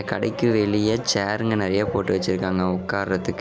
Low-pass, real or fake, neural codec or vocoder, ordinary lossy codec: none; real; none; none